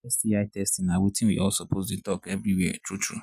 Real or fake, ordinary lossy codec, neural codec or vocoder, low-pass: real; none; none; 14.4 kHz